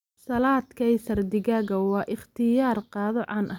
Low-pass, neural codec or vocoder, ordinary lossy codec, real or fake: 19.8 kHz; none; none; real